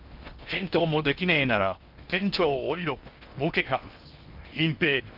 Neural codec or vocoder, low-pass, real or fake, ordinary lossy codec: codec, 16 kHz in and 24 kHz out, 0.6 kbps, FocalCodec, streaming, 4096 codes; 5.4 kHz; fake; Opus, 24 kbps